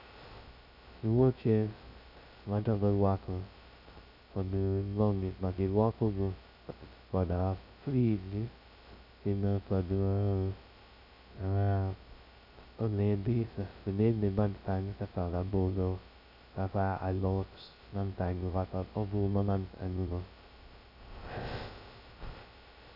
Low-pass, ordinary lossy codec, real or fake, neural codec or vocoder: 5.4 kHz; none; fake; codec, 16 kHz, 0.2 kbps, FocalCodec